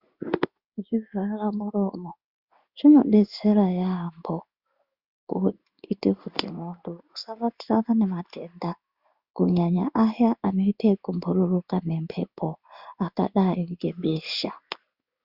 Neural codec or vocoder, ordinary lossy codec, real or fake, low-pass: codec, 16 kHz in and 24 kHz out, 1 kbps, XY-Tokenizer; Opus, 64 kbps; fake; 5.4 kHz